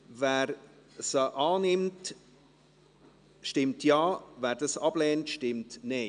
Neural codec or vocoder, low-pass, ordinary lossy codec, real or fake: none; 9.9 kHz; none; real